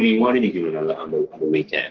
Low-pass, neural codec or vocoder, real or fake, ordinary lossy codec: 7.2 kHz; codec, 44.1 kHz, 3.4 kbps, Pupu-Codec; fake; Opus, 16 kbps